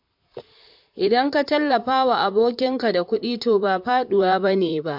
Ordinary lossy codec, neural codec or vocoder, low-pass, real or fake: MP3, 48 kbps; vocoder, 44.1 kHz, 128 mel bands, Pupu-Vocoder; 5.4 kHz; fake